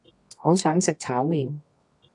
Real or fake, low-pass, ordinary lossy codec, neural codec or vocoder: fake; 10.8 kHz; AAC, 64 kbps; codec, 24 kHz, 0.9 kbps, WavTokenizer, medium music audio release